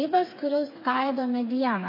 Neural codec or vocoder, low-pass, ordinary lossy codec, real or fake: codec, 16 kHz, 4 kbps, FreqCodec, smaller model; 5.4 kHz; MP3, 24 kbps; fake